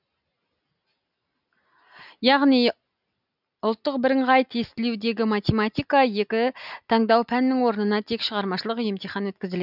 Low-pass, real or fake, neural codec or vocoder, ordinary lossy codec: 5.4 kHz; real; none; none